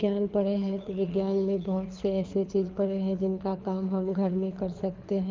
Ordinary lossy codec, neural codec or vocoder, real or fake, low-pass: Opus, 24 kbps; codec, 24 kHz, 6 kbps, HILCodec; fake; 7.2 kHz